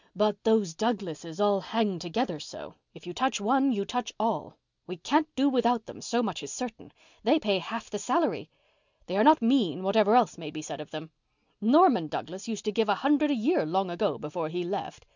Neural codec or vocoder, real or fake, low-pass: none; real; 7.2 kHz